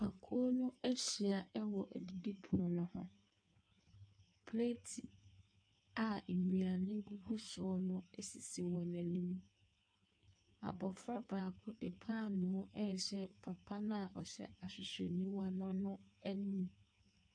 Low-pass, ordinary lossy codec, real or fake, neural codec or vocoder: 9.9 kHz; AAC, 64 kbps; fake; codec, 16 kHz in and 24 kHz out, 1.1 kbps, FireRedTTS-2 codec